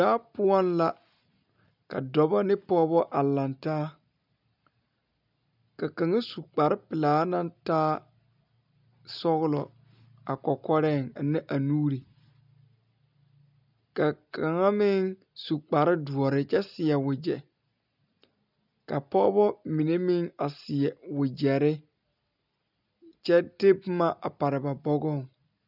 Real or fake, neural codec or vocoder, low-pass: real; none; 5.4 kHz